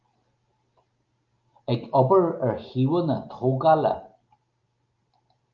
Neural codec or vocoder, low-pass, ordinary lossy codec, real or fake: none; 7.2 kHz; Opus, 24 kbps; real